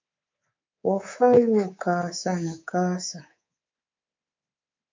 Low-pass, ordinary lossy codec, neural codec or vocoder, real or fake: 7.2 kHz; MP3, 64 kbps; codec, 24 kHz, 3.1 kbps, DualCodec; fake